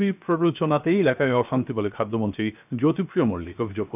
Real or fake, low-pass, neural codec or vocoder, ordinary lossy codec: fake; 3.6 kHz; codec, 16 kHz, 0.7 kbps, FocalCodec; none